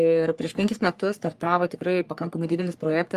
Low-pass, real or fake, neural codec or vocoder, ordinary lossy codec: 14.4 kHz; fake; codec, 44.1 kHz, 3.4 kbps, Pupu-Codec; Opus, 32 kbps